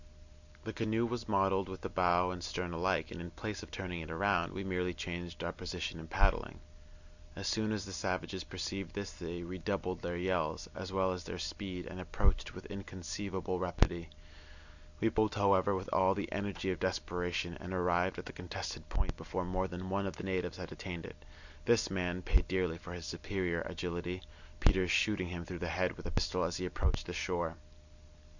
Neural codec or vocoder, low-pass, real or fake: none; 7.2 kHz; real